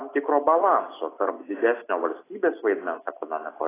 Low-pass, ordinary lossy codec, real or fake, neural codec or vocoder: 3.6 kHz; AAC, 16 kbps; real; none